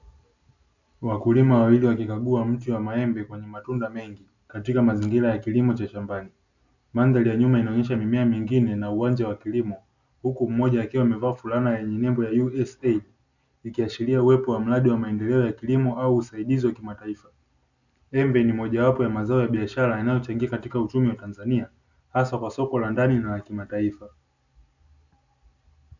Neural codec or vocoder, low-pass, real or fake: none; 7.2 kHz; real